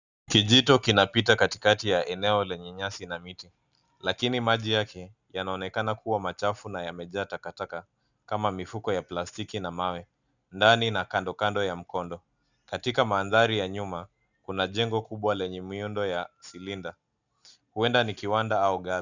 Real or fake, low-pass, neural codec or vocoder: real; 7.2 kHz; none